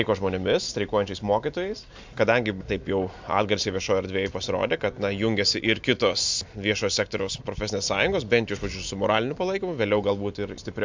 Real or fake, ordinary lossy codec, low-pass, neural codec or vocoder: real; MP3, 64 kbps; 7.2 kHz; none